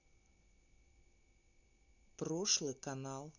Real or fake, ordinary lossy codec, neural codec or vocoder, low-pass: real; none; none; 7.2 kHz